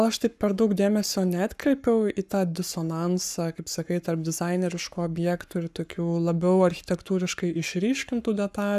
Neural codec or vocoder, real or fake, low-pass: codec, 44.1 kHz, 7.8 kbps, Pupu-Codec; fake; 14.4 kHz